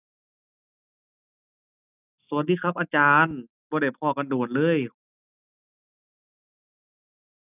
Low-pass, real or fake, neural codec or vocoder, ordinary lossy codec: 3.6 kHz; real; none; none